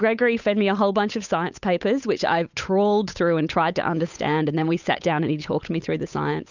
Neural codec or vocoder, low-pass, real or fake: codec, 16 kHz, 8 kbps, FunCodec, trained on Chinese and English, 25 frames a second; 7.2 kHz; fake